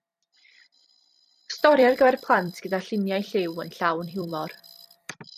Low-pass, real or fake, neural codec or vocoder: 14.4 kHz; fake; vocoder, 44.1 kHz, 128 mel bands every 256 samples, BigVGAN v2